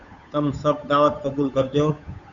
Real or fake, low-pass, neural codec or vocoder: fake; 7.2 kHz; codec, 16 kHz, 8 kbps, FunCodec, trained on Chinese and English, 25 frames a second